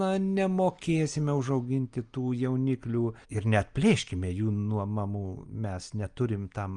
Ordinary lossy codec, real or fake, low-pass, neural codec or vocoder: Opus, 32 kbps; real; 9.9 kHz; none